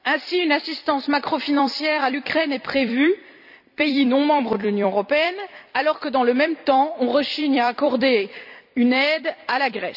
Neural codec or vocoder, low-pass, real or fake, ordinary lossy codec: vocoder, 44.1 kHz, 128 mel bands every 256 samples, BigVGAN v2; 5.4 kHz; fake; none